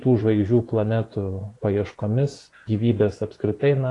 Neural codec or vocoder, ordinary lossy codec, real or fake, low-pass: vocoder, 24 kHz, 100 mel bands, Vocos; AAC, 48 kbps; fake; 10.8 kHz